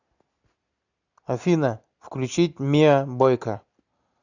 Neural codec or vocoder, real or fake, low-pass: none; real; 7.2 kHz